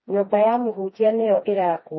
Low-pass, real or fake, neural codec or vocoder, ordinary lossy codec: 7.2 kHz; fake; codec, 16 kHz, 2 kbps, FreqCodec, smaller model; MP3, 24 kbps